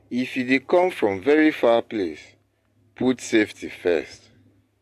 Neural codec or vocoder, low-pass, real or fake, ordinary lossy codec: none; 14.4 kHz; real; AAC, 64 kbps